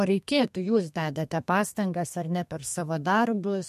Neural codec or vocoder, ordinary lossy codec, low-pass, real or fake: codec, 32 kHz, 1.9 kbps, SNAC; MP3, 64 kbps; 14.4 kHz; fake